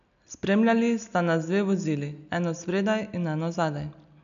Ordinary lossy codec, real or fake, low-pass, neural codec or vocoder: none; real; 7.2 kHz; none